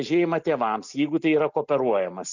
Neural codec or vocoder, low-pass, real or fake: none; 7.2 kHz; real